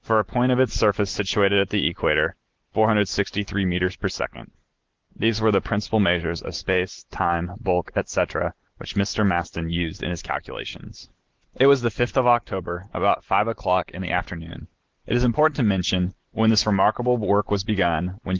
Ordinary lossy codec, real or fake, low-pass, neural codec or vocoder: Opus, 16 kbps; real; 7.2 kHz; none